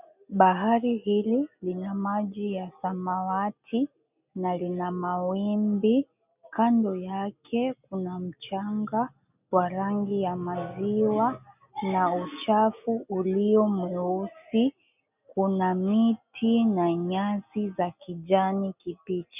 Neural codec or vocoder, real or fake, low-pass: none; real; 3.6 kHz